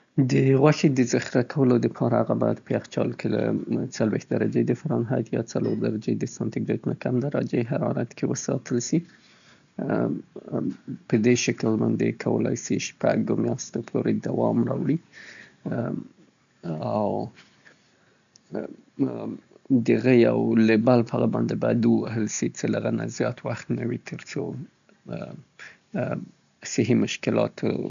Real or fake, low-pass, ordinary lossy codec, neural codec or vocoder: real; 7.2 kHz; none; none